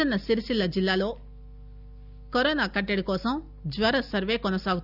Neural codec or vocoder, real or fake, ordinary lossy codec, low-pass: none; real; none; 5.4 kHz